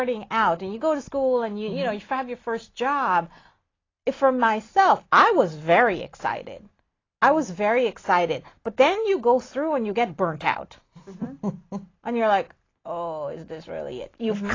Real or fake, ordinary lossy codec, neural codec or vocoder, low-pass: real; AAC, 32 kbps; none; 7.2 kHz